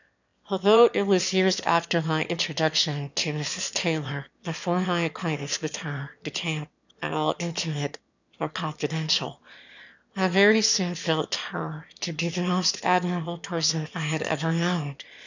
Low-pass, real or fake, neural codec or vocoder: 7.2 kHz; fake; autoencoder, 22.05 kHz, a latent of 192 numbers a frame, VITS, trained on one speaker